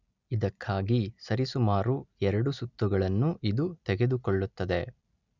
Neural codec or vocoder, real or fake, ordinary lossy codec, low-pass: none; real; none; 7.2 kHz